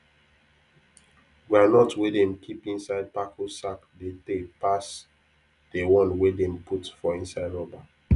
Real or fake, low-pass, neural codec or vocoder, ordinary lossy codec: real; 10.8 kHz; none; none